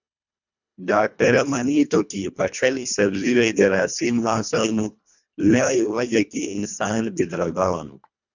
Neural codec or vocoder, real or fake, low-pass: codec, 24 kHz, 1.5 kbps, HILCodec; fake; 7.2 kHz